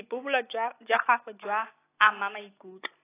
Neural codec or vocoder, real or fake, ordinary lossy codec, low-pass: none; real; AAC, 16 kbps; 3.6 kHz